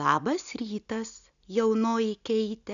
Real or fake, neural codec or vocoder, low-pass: real; none; 7.2 kHz